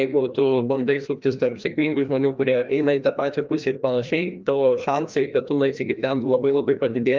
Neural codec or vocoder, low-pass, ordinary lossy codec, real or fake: codec, 16 kHz, 1 kbps, FreqCodec, larger model; 7.2 kHz; Opus, 24 kbps; fake